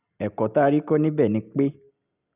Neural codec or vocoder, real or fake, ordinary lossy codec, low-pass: none; real; none; 3.6 kHz